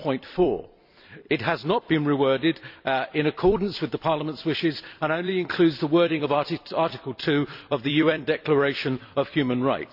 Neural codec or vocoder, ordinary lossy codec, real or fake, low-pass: vocoder, 44.1 kHz, 128 mel bands every 256 samples, BigVGAN v2; none; fake; 5.4 kHz